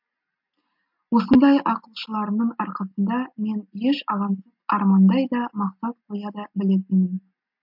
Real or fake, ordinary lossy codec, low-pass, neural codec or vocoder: real; MP3, 48 kbps; 5.4 kHz; none